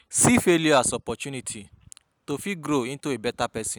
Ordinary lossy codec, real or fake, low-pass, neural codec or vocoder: none; real; none; none